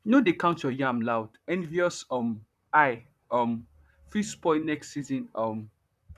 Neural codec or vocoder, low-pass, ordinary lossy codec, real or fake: vocoder, 44.1 kHz, 128 mel bands, Pupu-Vocoder; 14.4 kHz; none; fake